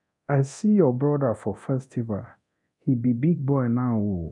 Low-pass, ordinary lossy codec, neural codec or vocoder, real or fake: 10.8 kHz; none; codec, 24 kHz, 0.9 kbps, DualCodec; fake